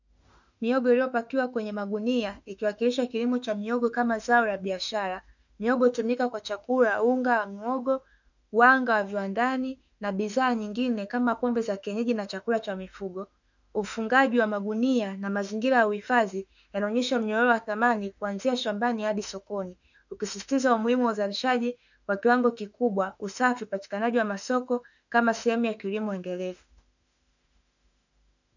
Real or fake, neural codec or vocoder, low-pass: fake; autoencoder, 48 kHz, 32 numbers a frame, DAC-VAE, trained on Japanese speech; 7.2 kHz